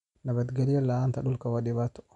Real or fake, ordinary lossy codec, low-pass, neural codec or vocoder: real; none; 10.8 kHz; none